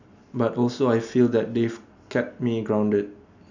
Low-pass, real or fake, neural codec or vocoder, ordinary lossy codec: 7.2 kHz; real; none; none